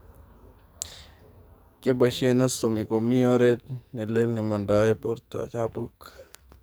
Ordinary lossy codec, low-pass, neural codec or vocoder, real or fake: none; none; codec, 44.1 kHz, 2.6 kbps, SNAC; fake